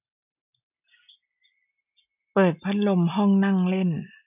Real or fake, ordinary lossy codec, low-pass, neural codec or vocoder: real; none; 3.6 kHz; none